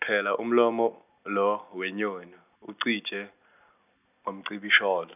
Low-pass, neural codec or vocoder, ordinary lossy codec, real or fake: 3.6 kHz; none; none; real